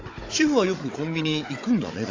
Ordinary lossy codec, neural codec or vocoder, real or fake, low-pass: AAC, 32 kbps; codec, 16 kHz, 16 kbps, FunCodec, trained on Chinese and English, 50 frames a second; fake; 7.2 kHz